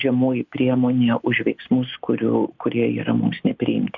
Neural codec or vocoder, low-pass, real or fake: none; 7.2 kHz; real